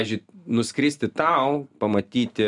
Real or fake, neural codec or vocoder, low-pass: real; none; 10.8 kHz